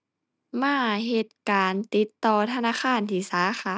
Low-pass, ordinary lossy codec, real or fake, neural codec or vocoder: none; none; real; none